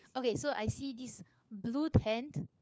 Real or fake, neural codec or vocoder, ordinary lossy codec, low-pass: fake; codec, 16 kHz, 4 kbps, FunCodec, trained on LibriTTS, 50 frames a second; none; none